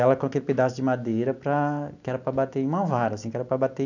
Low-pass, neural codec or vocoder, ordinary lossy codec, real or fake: 7.2 kHz; none; none; real